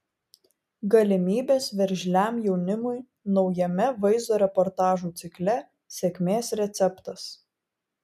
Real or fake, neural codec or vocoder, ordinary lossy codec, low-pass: real; none; MP3, 96 kbps; 14.4 kHz